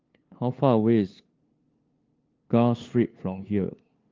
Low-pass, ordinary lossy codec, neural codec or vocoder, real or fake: 7.2 kHz; Opus, 32 kbps; codec, 16 kHz, 2 kbps, FunCodec, trained on LibriTTS, 25 frames a second; fake